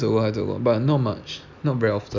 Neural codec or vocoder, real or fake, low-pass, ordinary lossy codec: none; real; 7.2 kHz; none